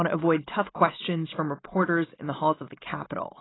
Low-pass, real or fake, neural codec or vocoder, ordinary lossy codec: 7.2 kHz; real; none; AAC, 16 kbps